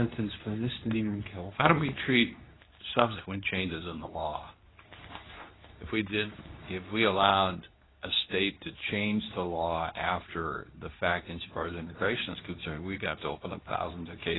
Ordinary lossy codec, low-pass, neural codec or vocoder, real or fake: AAC, 16 kbps; 7.2 kHz; codec, 24 kHz, 0.9 kbps, WavTokenizer, medium speech release version 2; fake